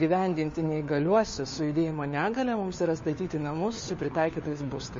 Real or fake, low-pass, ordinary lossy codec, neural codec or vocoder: fake; 7.2 kHz; MP3, 32 kbps; codec, 16 kHz, 4 kbps, FunCodec, trained on LibriTTS, 50 frames a second